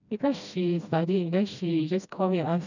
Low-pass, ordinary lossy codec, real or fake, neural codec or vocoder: 7.2 kHz; none; fake; codec, 16 kHz, 1 kbps, FreqCodec, smaller model